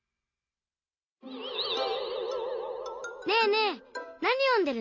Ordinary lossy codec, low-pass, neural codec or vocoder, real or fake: MP3, 48 kbps; 7.2 kHz; none; real